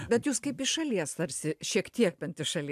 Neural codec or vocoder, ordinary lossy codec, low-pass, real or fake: none; AAC, 96 kbps; 14.4 kHz; real